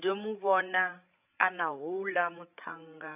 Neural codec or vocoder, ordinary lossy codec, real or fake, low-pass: codec, 16 kHz, 8 kbps, FreqCodec, larger model; AAC, 32 kbps; fake; 3.6 kHz